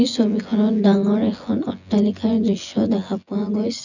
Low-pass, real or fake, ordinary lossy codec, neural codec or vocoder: 7.2 kHz; fake; none; vocoder, 24 kHz, 100 mel bands, Vocos